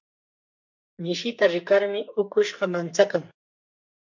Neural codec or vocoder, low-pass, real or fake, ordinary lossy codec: codec, 44.1 kHz, 2.6 kbps, SNAC; 7.2 kHz; fake; MP3, 48 kbps